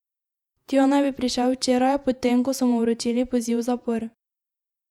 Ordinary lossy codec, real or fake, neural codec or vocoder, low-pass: none; fake; vocoder, 48 kHz, 128 mel bands, Vocos; 19.8 kHz